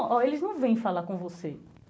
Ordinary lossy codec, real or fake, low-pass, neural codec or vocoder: none; real; none; none